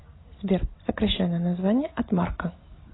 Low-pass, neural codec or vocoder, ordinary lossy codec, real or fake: 7.2 kHz; none; AAC, 16 kbps; real